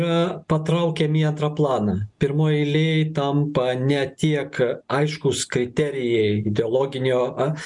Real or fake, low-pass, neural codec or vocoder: real; 10.8 kHz; none